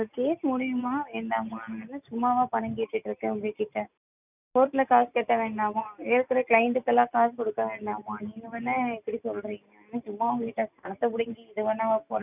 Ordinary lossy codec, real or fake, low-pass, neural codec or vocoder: none; real; 3.6 kHz; none